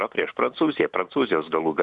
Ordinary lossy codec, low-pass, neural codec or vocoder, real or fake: Opus, 64 kbps; 10.8 kHz; none; real